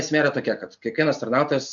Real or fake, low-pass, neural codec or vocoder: real; 7.2 kHz; none